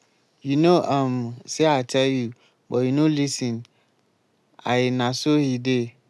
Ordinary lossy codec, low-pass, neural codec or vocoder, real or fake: none; none; none; real